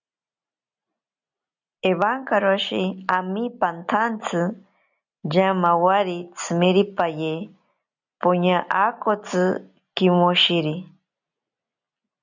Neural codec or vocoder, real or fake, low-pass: none; real; 7.2 kHz